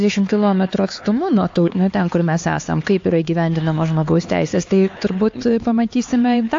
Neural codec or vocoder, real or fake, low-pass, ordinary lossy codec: codec, 16 kHz, 4 kbps, X-Codec, HuBERT features, trained on LibriSpeech; fake; 7.2 kHz; AAC, 48 kbps